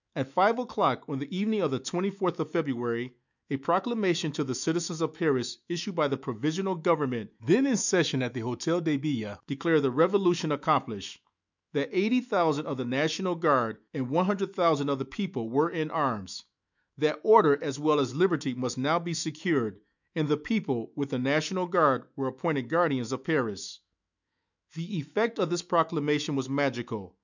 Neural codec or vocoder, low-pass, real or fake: autoencoder, 48 kHz, 128 numbers a frame, DAC-VAE, trained on Japanese speech; 7.2 kHz; fake